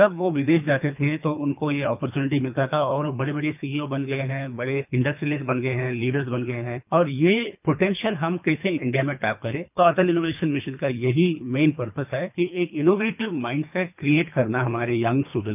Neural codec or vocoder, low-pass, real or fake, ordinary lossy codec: codec, 24 kHz, 3 kbps, HILCodec; 3.6 kHz; fake; none